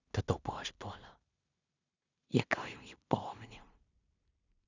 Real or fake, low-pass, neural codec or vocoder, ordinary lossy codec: fake; 7.2 kHz; codec, 16 kHz in and 24 kHz out, 0.4 kbps, LongCat-Audio-Codec, two codebook decoder; none